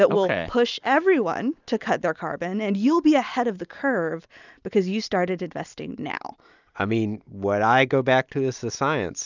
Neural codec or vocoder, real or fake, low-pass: none; real; 7.2 kHz